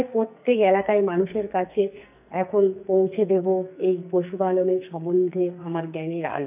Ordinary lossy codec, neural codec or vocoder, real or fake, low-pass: none; codec, 44.1 kHz, 2.6 kbps, SNAC; fake; 3.6 kHz